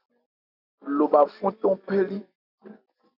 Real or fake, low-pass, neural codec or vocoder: real; 5.4 kHz; none